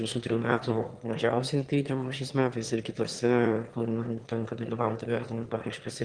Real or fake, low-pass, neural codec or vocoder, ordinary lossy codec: fake; 9.9 kHz; autoencoder, 22.05 kHz, a latent of 192 numbers a frame, VITS, trained on one speaker; Opus, 24 kbps